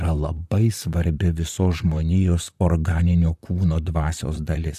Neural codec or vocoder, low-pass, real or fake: vocoder, 44.1 kHz, 128 mel bands, Pupu-Vocoder; 14.4 kHz; fake